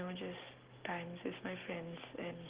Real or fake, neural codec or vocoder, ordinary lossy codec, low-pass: real; none; Opus, 16 kbps; 3.6 kHz